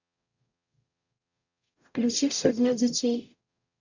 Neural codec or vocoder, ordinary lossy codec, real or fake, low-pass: codec, 44.1 kHz, 0.9 kbps, DAC; none; fake; 7.2 kHz